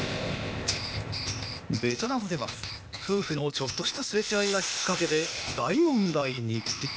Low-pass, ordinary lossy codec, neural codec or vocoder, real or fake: none; none; codec, 16 kHz, 0.8 kbps, ZipCodec; fake